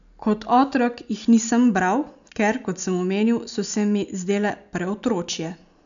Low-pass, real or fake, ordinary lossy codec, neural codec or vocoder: 7.2 kHz; real; none; none